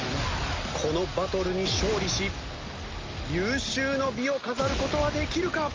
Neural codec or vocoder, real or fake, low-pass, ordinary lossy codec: none; real; 7.2 kHz; Opus, 32 kbps